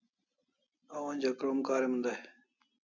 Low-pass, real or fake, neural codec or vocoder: 7.2 kHz; real; none